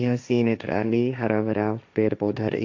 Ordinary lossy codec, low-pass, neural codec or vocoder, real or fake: MP3, 64 kbps; 7.2 kHz; codec, 16 kHz, 1.1 kbps, Voila-Tokenizer; fake